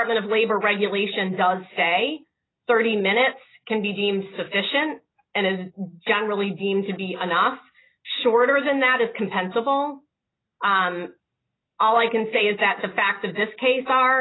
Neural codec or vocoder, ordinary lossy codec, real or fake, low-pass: none; AAC, 16 kbps; real; 7.2 kHz